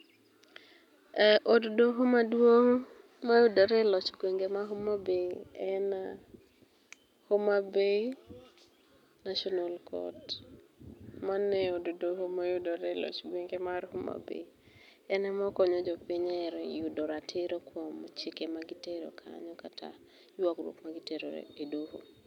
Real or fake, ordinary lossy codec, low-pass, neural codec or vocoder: real; none; 19.8 kHz; none